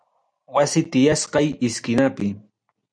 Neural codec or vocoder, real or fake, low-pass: none; real; 9.9 kHz